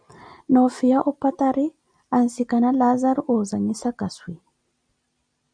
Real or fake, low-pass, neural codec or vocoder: real; 9.9 kHz; none